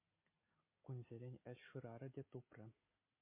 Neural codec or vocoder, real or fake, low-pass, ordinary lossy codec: none; real; 3.6 kHz; MP3, 24 kbps